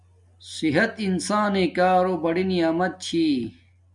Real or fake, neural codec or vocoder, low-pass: real; none; 10.8 kHz